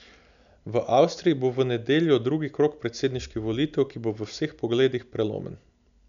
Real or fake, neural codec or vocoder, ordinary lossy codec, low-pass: real; none; none; 7.2 kHz